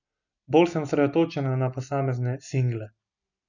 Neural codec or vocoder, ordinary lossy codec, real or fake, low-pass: none; none; real; 7.2 kHz